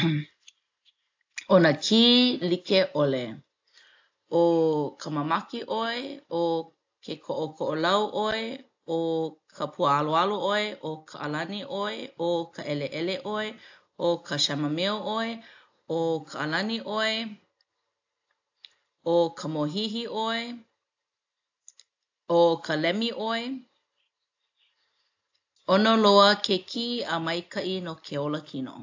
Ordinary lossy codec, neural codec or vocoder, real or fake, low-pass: AAC, 48 kbps; none; real; 7.2 kHz